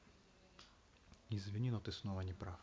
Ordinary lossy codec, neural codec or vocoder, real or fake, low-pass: none; none; real; none